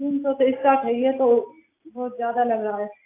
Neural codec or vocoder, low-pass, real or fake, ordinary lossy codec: none; 3.6 kHz; real; AAC, 24 kbps